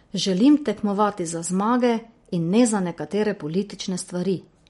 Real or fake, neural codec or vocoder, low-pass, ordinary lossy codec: real; none; 19.8 kHz; MP3, 48 kbps